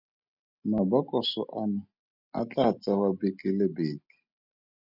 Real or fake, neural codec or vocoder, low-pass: real; none; 5.4 kHz